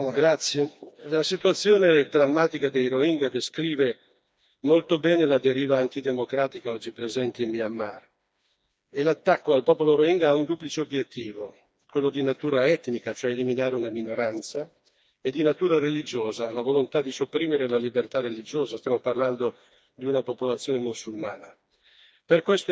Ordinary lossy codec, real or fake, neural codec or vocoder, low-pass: none; fake; codec, 16 kHz, 2 kbps, FreqCodec, smaller model; none